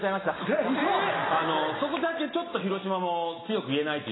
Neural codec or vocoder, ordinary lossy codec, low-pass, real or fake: none; AAC, 16 kbps; 7.2 kHz; real